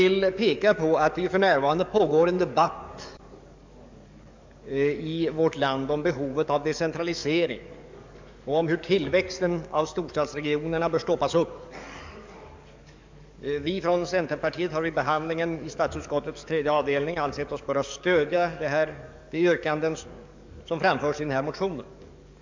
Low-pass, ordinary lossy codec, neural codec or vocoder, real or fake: 7.2 kHz; MP3, 64 kbps; codec, 44.1 kHz, 7.8 kbps, DAC; fake